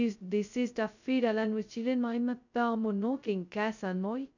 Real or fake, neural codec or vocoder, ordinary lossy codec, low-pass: fake; codec, 16 kHz, 0.2 kbps, FocalCodec; none; 7.2 kHz